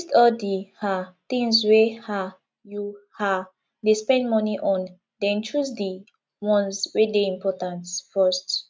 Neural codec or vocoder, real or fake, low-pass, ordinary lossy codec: none; real; none; none